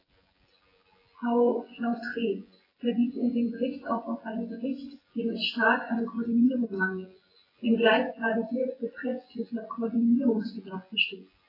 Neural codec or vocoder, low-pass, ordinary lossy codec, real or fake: vocoder, 24 kHz, 100 mel bands, Vocos; 5.4 kHz; AAC, 24 kbps; fake